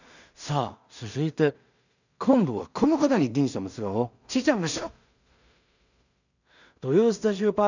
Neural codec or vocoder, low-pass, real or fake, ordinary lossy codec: codec, 16 kHz in and 24 kHz out, 0.4 kbps, LongCat-Audio-Codec, two codebook decoder; 7.2 kHz; fake; none